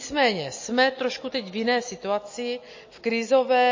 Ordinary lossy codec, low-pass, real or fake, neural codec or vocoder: MP3, 32 kbps; 7.2 kHz; real; none